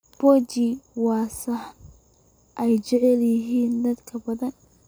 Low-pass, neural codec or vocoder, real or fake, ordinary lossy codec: none; none; real; none